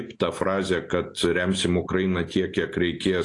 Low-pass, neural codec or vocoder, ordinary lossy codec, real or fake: 10.8 kHz; none; AAC, 48 kbps; real